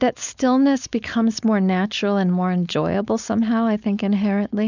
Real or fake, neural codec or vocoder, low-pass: fake; codec, 16 kHz, 4.8 kbps, FACodec; 7.2 kHz